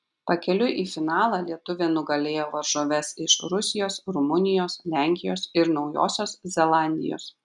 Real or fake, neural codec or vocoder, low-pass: real; none; 10.8 kHz